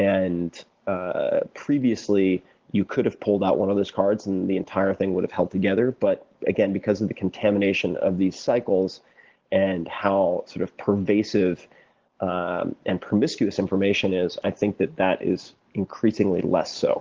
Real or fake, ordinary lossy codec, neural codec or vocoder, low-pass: real; Opus, 16 kbps; none; 7.2 kHz